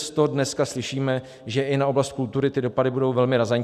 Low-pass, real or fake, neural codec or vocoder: 14.4 kHz; real; none